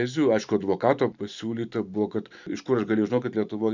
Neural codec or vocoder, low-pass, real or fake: vocoder, 44.1 kHz, 128 mel bands every 512 samples, BigVGAN v2; 7.2 kHz; fake